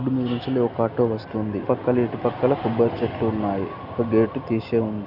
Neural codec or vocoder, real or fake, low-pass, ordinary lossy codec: none; real; 5.4 kHz; none